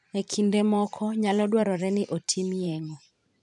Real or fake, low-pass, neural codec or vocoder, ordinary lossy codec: fake; 10.8 kHz; vocoder, 44.1 kHz, 128 mel bands every 512 samples, BigVGAN v2; none